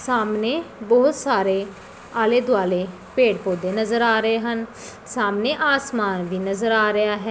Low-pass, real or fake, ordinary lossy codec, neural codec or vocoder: none; real; none; none